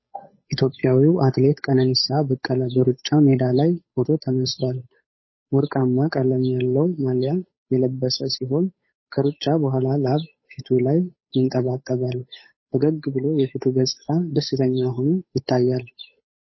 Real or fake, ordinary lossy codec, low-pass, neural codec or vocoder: fake; MP3, 24 kbps; 7.2 kHz; codec, 16 kHz, 8 kbps, FunCodec, trained on Chinese and English, 25 frames a second